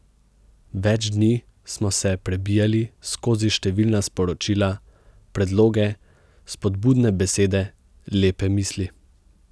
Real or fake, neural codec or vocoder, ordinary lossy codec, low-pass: real; none; none; none